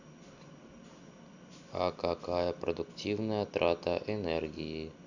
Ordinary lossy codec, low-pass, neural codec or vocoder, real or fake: Opus, 64 kbps; 7.2 kHz; none; real